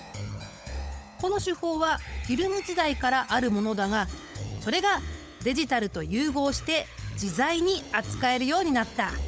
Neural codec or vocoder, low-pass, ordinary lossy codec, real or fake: codec, 16 kHz, 16 kbps, FunCodec, trained on LibriTTS, 50 frames a second; none; none; fake